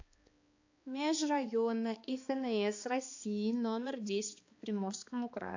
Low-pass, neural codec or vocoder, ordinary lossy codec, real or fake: 7.2 kHz; codec, 16 kHz, 2 kbps, X-Codec, HuBERT features, trained on balanced general audio; AAC, 48 kbps; fake